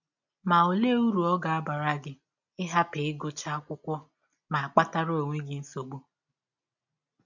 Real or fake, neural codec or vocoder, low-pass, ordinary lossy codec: real; none; 7.2 kHz; none